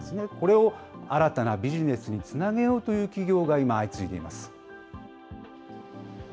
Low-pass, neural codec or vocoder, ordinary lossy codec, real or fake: none; none; none; real